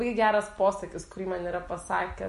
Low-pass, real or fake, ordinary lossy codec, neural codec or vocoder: 14.4 kHz; real; MP3, 48 kbps; none